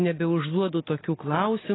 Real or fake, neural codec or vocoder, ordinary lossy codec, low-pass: real; none; AAC, 16 kbps; 7.2 kHz